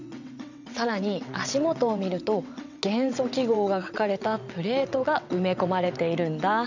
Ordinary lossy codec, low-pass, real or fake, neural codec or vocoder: none; 7.2 kHz; fake; vocoder, 22.05 kHz, 80 mel bands, WaveNeXt